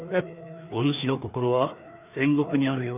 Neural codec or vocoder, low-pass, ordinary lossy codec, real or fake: codec, 16 kHz, 2 kbps, FreqCodec, larger model; 3.6 kHz; none; fake